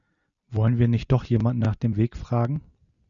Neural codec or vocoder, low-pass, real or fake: none; 7.2 kHz; real